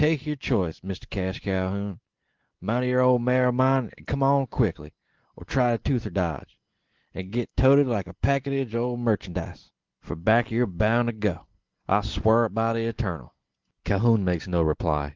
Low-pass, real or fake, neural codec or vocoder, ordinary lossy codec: 7.2 kHz; real; none; Opus, 16 kbps